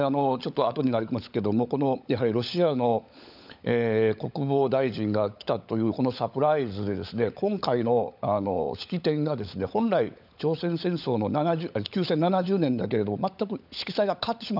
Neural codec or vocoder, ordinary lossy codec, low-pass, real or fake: codec, 16 kHz, 16 kbps, FunCodec, trained on LibriTTS, 50 frames a second; none; 5.4 kHz; fake